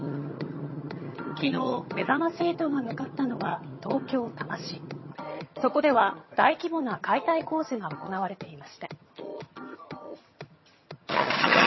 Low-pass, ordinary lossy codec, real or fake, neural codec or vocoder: 7.2 kHz; MP3, 24 kbps; fake; vocoder, 22.05 kHz, 80 mel bands, HiFi-GAN